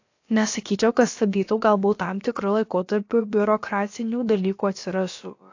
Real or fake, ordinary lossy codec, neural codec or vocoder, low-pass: fake; AAC, 48 kbps; codec, 16 kHz, about 1 kbps, DyCAST, with the encoder's durations; 7.2 kHz